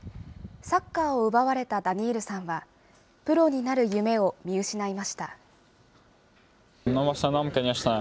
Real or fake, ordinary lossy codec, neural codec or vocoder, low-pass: real; none; none; none